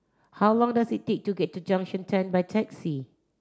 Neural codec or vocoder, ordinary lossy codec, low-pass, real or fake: none; none; none; real